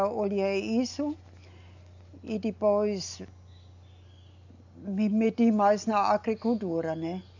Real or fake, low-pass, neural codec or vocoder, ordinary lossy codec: real; 7.2 kHz; none; none